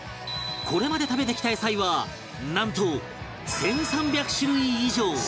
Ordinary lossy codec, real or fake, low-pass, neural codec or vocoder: none; real; none; none